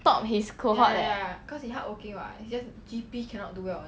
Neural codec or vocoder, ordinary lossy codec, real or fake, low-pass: none; none; real; none